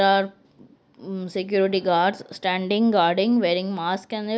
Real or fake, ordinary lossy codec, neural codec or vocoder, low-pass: real; none; none; none